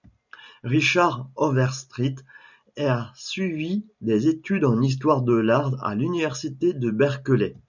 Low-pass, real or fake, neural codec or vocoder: 7.2 kHz; real; none